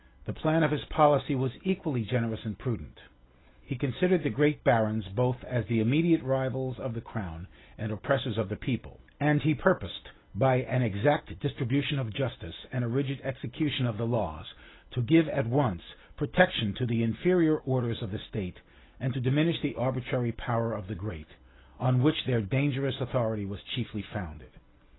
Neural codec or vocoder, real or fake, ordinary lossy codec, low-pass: none; real; AAC, 16 kbps; 7.2 kHz